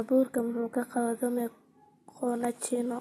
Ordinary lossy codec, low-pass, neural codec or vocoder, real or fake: AAC, 32 kbps; 19.8 kHz; none; real